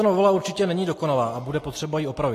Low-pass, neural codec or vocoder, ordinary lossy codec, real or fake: 14.4 kHz; none; AAC, 48 kbps; real